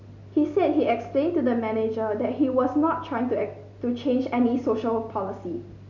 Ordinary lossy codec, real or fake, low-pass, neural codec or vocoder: none; real; 7.2 kHz; none